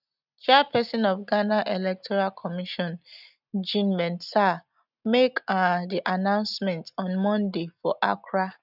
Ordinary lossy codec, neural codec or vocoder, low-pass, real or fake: none; none; 5.4 kHz; real